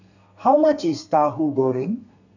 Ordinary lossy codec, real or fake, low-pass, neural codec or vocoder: none; fake; 7.2 kHz; codec, 32 kHz, 1.9 kbps, SNAC